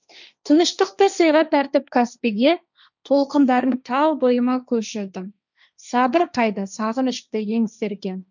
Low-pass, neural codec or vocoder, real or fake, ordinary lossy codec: 7.2 kHz; codec, 16 kHz, 1.1 kbps, Voila-Tokenizer; fake; none